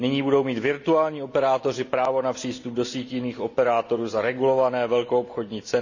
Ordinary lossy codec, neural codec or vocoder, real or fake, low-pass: none; none; real; 7.2 kHz